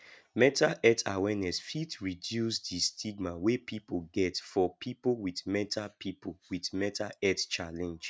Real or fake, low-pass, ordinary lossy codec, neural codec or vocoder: real; none; none; none